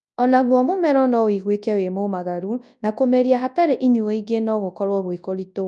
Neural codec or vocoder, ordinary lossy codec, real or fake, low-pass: codec, 24 kHz, 0.9 kbps, WavTokenizer, large speech release; none; fake; 10.8 kHz